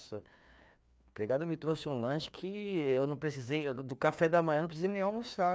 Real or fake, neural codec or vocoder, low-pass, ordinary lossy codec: fake; codec, 16 kHz, 2 kbps, FreqCodec, larger model; none; none